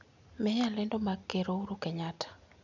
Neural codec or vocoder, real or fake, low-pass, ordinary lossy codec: none; real; 7.2 kHz; none